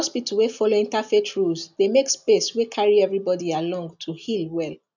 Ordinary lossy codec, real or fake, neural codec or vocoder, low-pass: none; real; none; 7.2 kHz